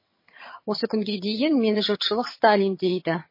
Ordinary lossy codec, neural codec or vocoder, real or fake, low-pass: MP3, 24 kbps; vocoder, 22.05 kHz, 80 mel bands, HiFi-GAN; fake; 5.4 kHz